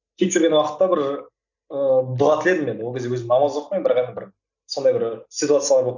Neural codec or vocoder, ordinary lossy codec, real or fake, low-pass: none; none; real; 7.2 kHz